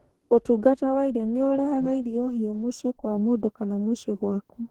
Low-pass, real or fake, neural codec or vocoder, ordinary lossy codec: 19.8 kHz; fake; codec, 44.1 kHz, 2.6 kbps, DAC; Opus, 16 kbps